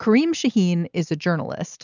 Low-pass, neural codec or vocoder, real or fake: 7.2 kHz; none; real